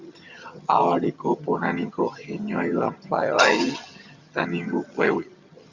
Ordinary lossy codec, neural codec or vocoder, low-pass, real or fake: Opus, 64 kbps; vocoder, 22.05 kHz, 80 mel bands, HiFi-GAN; 7.2 kHz; fake